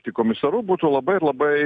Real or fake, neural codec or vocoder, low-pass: real; none; 9.9 kHz